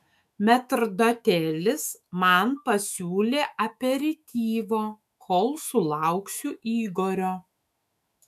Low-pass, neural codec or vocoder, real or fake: 14.4 kHz; autoencoder, 48 kHz, 128 numbers a frame, DAC-VAE, trained on Japanese speech; fake